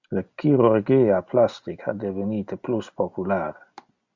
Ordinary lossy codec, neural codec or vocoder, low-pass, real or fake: MP3, 64 kbps; none; 7.2 kHz; real